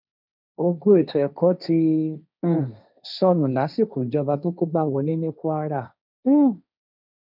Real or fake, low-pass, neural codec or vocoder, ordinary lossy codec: fake; 5.4 kHz; codec, 16 kHz, 1.1 kbps, Voila-Tokenizer; none